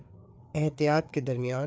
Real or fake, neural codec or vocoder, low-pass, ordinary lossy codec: fake; codec, 16 kHz, 4 kbps, FreqCodec, larger model; none; none